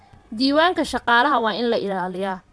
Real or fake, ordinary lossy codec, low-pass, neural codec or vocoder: fake; none; none; vocoder, 22.05 kHz, 80 mel bands, Vocos